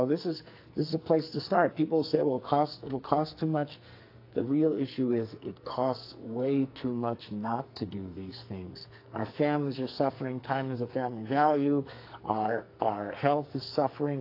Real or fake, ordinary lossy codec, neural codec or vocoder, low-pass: fake; AAC, 32 kbps; codec, 44.1 kHz, 2.6 kbps, SNAC; 5.4 kHz